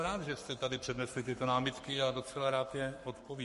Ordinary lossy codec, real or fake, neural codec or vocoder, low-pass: MP3, 48 kbps; fake; codec, 44.1 kHz, 7.8 kbps, Pupu-Codec; 14.4 kHz